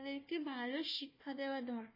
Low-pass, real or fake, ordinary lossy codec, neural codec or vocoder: 5.4 kHz; fake; MP3, 24 kbps; codec, 16 kHz, 1 kbps, FunCodec, trained on Chinese and English, 50 frames a second